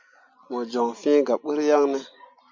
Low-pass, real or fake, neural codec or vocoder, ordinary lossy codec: 7.2 kHz; real; none; MP3, 48 kbps